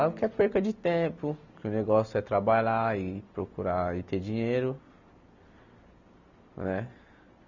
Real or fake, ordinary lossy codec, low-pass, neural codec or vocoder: real; none; 7.2 kHz; none